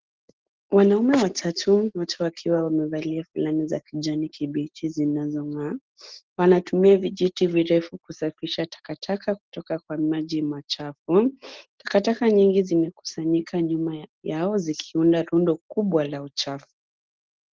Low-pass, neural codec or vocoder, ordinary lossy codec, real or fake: 7.2 kHz; none; Opus, 16 kbps; real